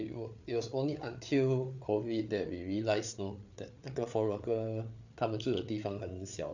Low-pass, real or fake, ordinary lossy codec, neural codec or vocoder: 7.2 kHz; fake; none; codec, 16 kHz, 8 kbps, FreqCodec, larger model